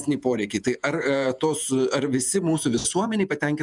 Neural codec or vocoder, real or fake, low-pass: vocoder, 48 kHz, 128 mel bands, Vocos; fake; 10.8 kHz